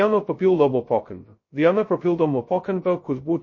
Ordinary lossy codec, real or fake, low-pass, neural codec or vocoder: MP3, 32 kbps; fake; 7.2 kHz; codec, 16 kHz, 0.2 kbps, FocalCodec